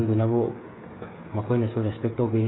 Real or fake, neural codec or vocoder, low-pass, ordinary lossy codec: fake; codec, 16 kHz, 8 kbps, FreqCodec, smaller model; 7.2 kHz; AAC, 16 kbps